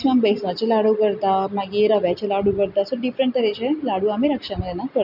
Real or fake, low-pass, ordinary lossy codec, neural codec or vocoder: real; 5.4 kHz; none; none